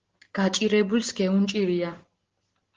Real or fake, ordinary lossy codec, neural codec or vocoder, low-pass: real; Opus, 16 kbps; none; 7.2 kHz